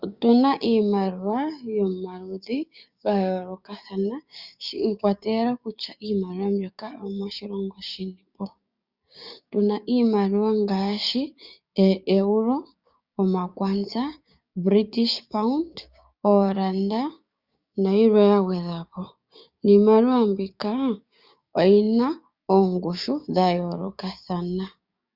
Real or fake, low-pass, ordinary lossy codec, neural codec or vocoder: fake; 5.4 kHz; Opus, 64 kbps; codec, 16 kHz, 6 kbps, DAC